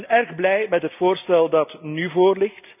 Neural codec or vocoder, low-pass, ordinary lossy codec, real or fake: none; 3.6 kHz; none; real